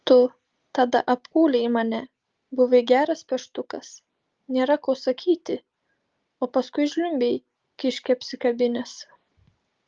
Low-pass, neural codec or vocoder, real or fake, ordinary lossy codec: 7.2 kHz; none; real; Opus, 32 kbps